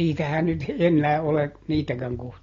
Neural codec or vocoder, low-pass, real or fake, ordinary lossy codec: none; 7.2 kHz; real; AAC, 32 kbps